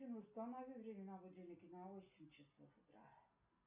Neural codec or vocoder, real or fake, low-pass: none; real; 3.6 kHz